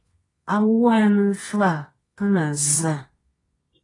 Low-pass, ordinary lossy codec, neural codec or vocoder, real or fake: 10.8 kHz; AAC, 32 kbps; codec, 24 kHz, 0.9 kbps, WavTokenizer, medium music audio release; fake